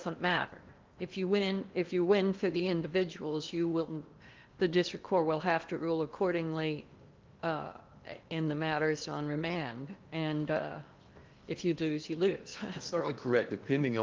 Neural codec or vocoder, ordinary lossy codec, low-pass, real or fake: codec, 16 kHz in and 24 kHz out, 0.8 kbps, FocalCodec, streaming, 65536 codes; Opus, 32 kbps; 7.2 kHz; fake